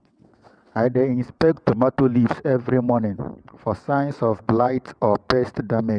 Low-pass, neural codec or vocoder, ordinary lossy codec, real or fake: 9.9 kHz; vocoder, 22.05 kHz, 80 mel bands, WaveNeXt; none; fake